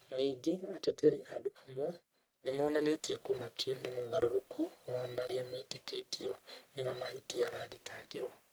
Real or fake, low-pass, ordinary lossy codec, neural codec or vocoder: fake; none; none; codec, 44.1 kHz, 1.7 kbps, Pupu-Codec